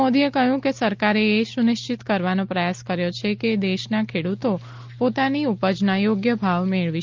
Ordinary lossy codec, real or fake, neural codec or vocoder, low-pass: Opus, 32 kbps; real; none; 7.2 kHz